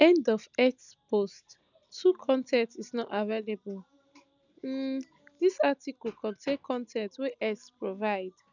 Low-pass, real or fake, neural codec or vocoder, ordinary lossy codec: 7.2 kHz; real; none; none